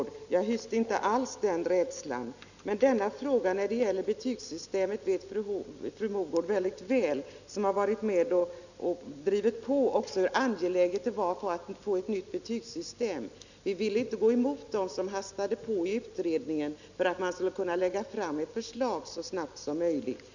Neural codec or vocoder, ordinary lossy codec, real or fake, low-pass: none; none; real; 7.2 kHz